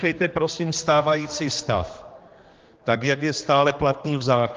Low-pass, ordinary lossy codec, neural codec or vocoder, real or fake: 7.2 kHz; Opus, 32 kbps; codec, 16 kHz, 2 kbps, X-Codec, HuBERT features, trained on general audio; fake